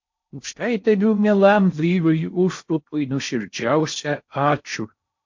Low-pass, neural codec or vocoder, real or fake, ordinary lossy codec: 7.2 kHz; codec, 16 kHz in and 24 kHz out, 0.6 kbps, FocalCodec, streaming, 4096 codes; fake; MP3, 48 kbps